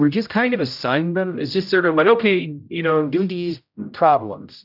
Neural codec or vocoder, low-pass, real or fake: codec, 16 kHz, 0.5 kbps, X-Codec, HuBERT features, trained on general audio; 5.4 kHz; fake